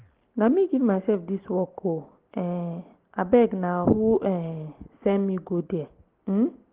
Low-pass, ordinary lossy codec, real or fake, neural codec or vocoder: 3.6 kHz; Opus, 16 kbps; real; none